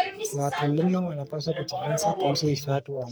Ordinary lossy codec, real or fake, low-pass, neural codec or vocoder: none; fake; none; codec, 44.1 kHz, 3.4 kbps, Pupu-Codec